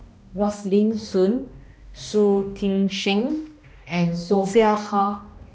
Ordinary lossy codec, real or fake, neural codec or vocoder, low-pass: none; fake; codec, 16 kHz, 1 kbps, X-Codec, HuBERT features, trained on balanced general audio; none